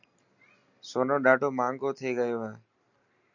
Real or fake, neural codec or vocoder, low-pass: real; none; 7.2 kHz